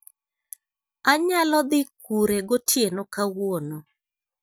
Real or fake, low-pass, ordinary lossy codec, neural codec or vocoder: real; none; none; none